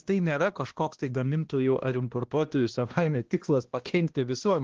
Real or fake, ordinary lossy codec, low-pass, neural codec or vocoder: fake; Opus, 16 kbps; 7.2 kHz; codec, 16 kHz, 1 kbps, X-Codec, HuBERT features, trained on balanced general audio